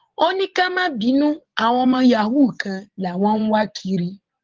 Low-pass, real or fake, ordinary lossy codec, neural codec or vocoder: 7.2 kHz; fake; Opus, 16 kbps; vocoder, 24 kHz, 100 mel bands, Vocos